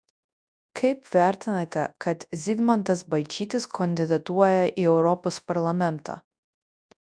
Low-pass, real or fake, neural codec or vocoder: 9.9 kHz; fake; codec, 24 kHz, 0.9 kbps, WavTokenizer, large speech release